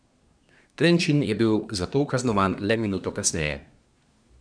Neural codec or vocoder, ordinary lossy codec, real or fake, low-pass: codec, 24 kHz, 1 kbps, SNAC; none; fake; 9.9 kHz